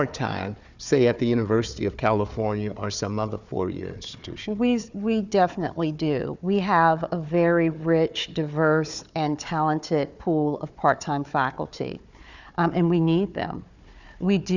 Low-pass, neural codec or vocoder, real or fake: 7.2 kHz; codec, 16 kHz, 4 kbps, FunCodec, trained on Chinese and English, 50 frames a second; fake